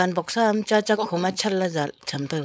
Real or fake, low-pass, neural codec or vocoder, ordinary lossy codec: fake; none; codec, 16 kHz, 4.8 kbps, FACodec; none